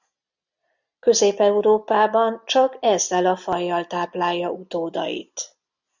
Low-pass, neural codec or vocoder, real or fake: 7.2 kHz; none; real